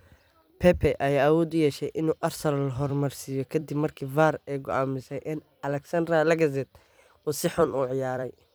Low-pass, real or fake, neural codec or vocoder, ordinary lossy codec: none; fake; vocoder, 44.1 kHz, 128 mel bands every 256 samples, BigVGAN v2; none